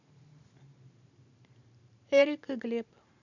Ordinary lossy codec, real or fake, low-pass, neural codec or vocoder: none; fake; 7.2 kHz; vocoder, 44.1 kHz, 128 mel bands every 512 samples, BigVGAN v2